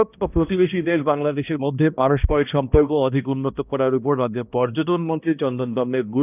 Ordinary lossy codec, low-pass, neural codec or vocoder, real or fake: none; 3.6 kHz; codec, 16 kHz, 1 kbps, X-Codec, HuBERT features, trained on balanced general audio; fake